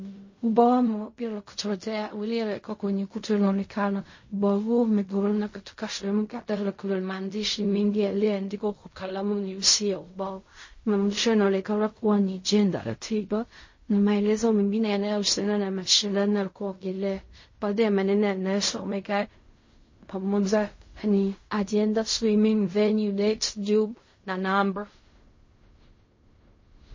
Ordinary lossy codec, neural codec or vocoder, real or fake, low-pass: MP3, 32 kbps; codec, 16 kHz in and 24 kHz out, 0.4 kbps, LongCat-Audio-Codec, fine tuned four codebook decoder; fake; 7.2 kHz